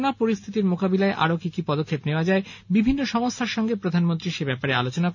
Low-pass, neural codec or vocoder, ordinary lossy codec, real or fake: 7.2 kHz; none; none; real